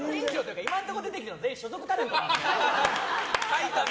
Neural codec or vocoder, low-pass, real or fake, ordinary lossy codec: none; none; real; none